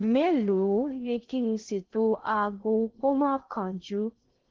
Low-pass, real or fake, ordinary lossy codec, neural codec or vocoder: 7.2 kHz; fake; Opus, 16 kbps; codec, 16 kHz in and 24 kHz out, 0.6 kbps, FocalCodec, streaming, 2048 codes